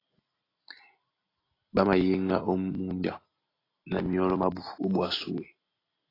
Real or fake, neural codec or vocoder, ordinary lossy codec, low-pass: real; none; AAC, 24 kbps; 5.4 kHz